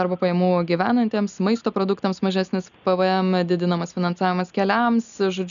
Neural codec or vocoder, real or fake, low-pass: none; real; 7.2 kHz